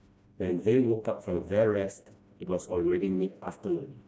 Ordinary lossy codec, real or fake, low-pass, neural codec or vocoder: none; fake; none; codec, 16 kHz, 1 kbps, FreqCodec, smaller model